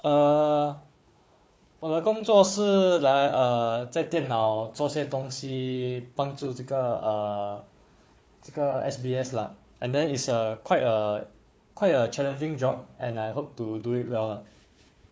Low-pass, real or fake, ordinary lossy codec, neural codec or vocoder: none; fake; none; codec, 16 kHz, 4 kbps, FunCodec, trained on Chinese and English, 50 frames a second